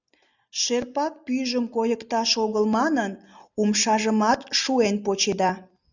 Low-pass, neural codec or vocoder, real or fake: 7.2 kHz; none; real